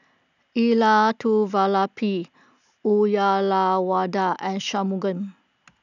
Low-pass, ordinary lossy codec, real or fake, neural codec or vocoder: 7.2 kHz; none; real; none